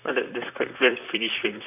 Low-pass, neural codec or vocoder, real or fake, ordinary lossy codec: 3.6 kHz; vocoder, 44.1 kHz, 128 mel bands, Pupu-Vocoder; fake; none